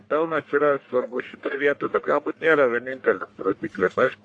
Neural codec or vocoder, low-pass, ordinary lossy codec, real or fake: codec, 44.1 kHz, 1.7 kbps, Pupu-Codec; 9.9 kHz; AAC, 48 kbps; fake